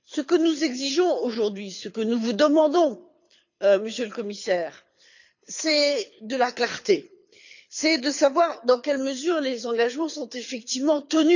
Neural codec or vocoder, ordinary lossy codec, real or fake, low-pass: codec, 24 kHz, 6 kbps, HILCodec; none; fake; 7.2 kHz